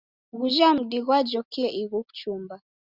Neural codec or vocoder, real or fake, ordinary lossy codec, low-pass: none; real; Opus, 64 kbps; 5.4 kHz